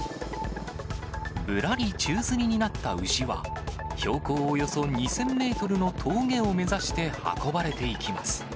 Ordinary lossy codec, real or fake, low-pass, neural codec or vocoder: none; real; none; none